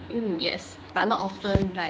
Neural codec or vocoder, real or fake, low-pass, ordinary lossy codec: codec, 16 kHz, 2 kbps, X-Codec, HuBERT features, trained on general audio; fake; none; none